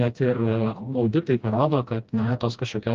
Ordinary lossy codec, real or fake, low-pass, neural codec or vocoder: Opus, 32 kbps; fake; 7.2 kHz; codec, 16 kHz, 1 kbps, FreqCodec, smaller model